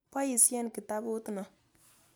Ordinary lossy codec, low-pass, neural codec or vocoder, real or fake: none; none; none; real